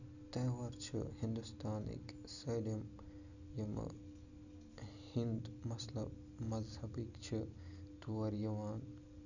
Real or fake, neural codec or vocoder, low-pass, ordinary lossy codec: real; none; 7.2 kHz; none